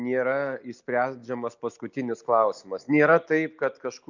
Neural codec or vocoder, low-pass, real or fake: none; 7.2 kHz; real